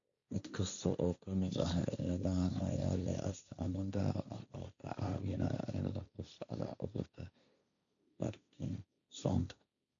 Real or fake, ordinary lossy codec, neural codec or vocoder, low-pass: fake; MP3, 64 kbps; codec, 16 kHz, 1.1 kbps, Voila-Tokenizer; 7.2 kHz